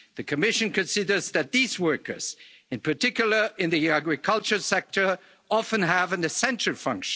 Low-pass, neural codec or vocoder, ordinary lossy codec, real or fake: none; none; none; real